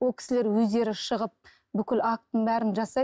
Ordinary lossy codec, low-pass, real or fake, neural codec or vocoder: none; none; real; none